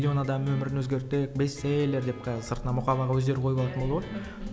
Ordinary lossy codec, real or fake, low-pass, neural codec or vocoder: none; real; none; none